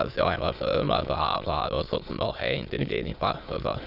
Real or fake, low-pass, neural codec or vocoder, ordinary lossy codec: fake; 5.4 kHz; autoencoder, 22.05 kHz, a latent of 192 numbers a frame, VITS, trained on many speakers; none